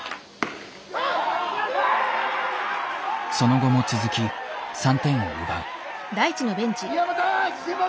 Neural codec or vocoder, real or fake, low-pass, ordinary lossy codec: none; real; none; none